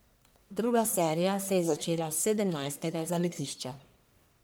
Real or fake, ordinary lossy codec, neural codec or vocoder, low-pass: fake; none; codec, 44.1 kHz, 1.7 kbps, Pupu-Codec; none